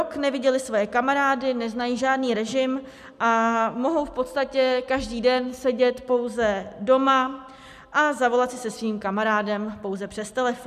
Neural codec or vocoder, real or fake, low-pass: none; real; 14.4 kHz